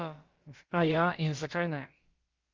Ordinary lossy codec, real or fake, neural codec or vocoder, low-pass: Opus, 32 kbps; fake; codec, 16 kHz, about 1 kbps, DyCAST, with the encoder's durations; 7.2 kHz